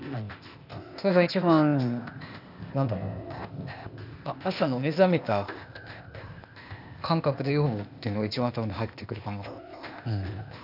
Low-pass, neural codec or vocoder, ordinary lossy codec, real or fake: 5.4 kHz; codec, 16 kHz, 0.8 kbps, ZipCodec; none; fake